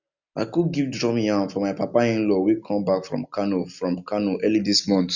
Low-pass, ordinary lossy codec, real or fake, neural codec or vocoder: 7.2 kHz; none; real; none